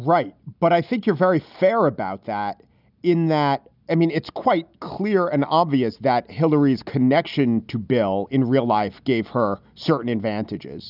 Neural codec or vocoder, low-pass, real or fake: none; 5.4 kHz; real